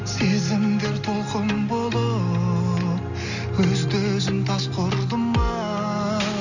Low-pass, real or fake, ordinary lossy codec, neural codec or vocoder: 7.2 kHz; real; none; none